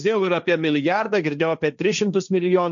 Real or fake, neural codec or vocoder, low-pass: fake; codec, 16 kHz, 1.1 kbps, Voila-Tokenizer; 7.2 kHz